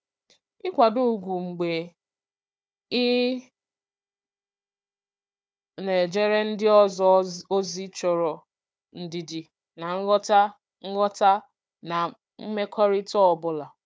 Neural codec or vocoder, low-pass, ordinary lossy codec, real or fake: codec, 16 kHz, 4 kbps, FunCodec, trained on Chinese and English, 50 frames a second; none; none; fake